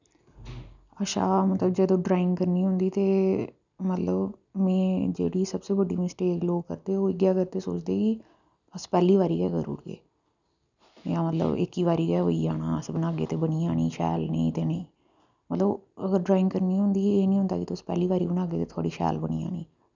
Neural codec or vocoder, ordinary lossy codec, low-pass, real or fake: none; none; 7.2 kHz; real